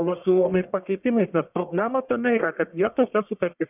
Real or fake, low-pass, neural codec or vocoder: fake; 3.6 kHz; codec, 44.1 kHz, 1.7 kbps, Pupu-Codec